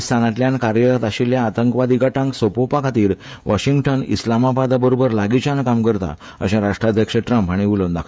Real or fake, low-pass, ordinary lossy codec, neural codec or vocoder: fake; none; none; codec, 16 kHz, 16 kbps, FreqCodec, smaller model